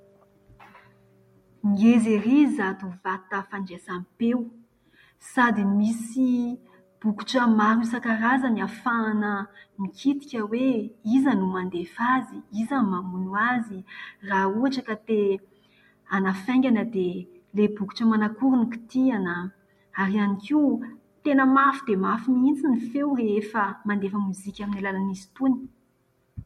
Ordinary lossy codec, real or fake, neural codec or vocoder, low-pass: MP3, 64 kbps; real; none; 19.8 kHz